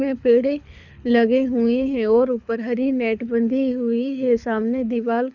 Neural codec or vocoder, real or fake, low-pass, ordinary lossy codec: codec, 24 kHz, 6 kbps, HILCodec; fake; 7.2 kHz; none